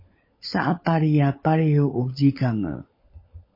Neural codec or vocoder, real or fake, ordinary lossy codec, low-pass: codec, 16 kHz, 16 kbps, FunCodec, trained on LibriTTS, 50 frames a second; fake; MP3, 24 kbps; 5.4 kHz